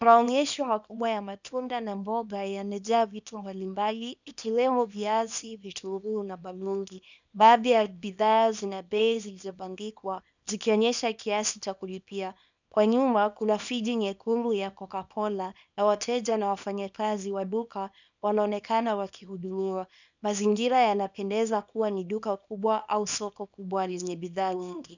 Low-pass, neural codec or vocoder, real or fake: 7.2 kHz; codec, 24 kHz, 0.9 kbps, WavTokenizer, small release; fake